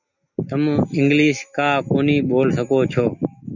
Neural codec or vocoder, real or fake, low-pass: none; real; 7.2 kHz